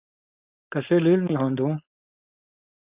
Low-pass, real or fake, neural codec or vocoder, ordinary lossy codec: 3.6 kHz; fake; codec, 16 kHz, 4.8 kbps, FACodec; Opus, 64 kbps